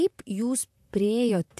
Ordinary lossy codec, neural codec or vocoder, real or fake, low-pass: AAC, 96 kbps; vocoder, 44.1 kHz, 128 mel bands, Pupu-Vocoder; fake; 14.4 kHz